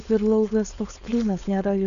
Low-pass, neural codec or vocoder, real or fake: 7.2 kHz; codec, 16 kHz, 4.8 kbps, FACodec; fake